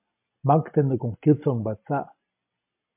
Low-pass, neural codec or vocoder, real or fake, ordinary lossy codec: 3.6 kHz; none; real; MP3, 32 kbps